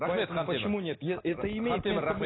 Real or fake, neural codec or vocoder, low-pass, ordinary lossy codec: real; none; 7.2 kHz; AAC, 16 kbps